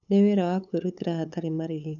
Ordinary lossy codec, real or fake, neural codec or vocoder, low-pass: none; fake; codec, 16 kHz, 8 kbps, FunCodec, trained on LibriTTS, 25 frames a second; 7.2 kHz